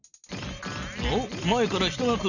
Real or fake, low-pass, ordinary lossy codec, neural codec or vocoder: real; 7.2 kHz; none; none